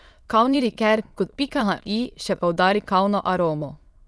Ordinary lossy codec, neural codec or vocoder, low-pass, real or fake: none; autoencoder, 22.05 kHz, a latent of 192 numbers a frame, VITS, trained on many speakers; none; fake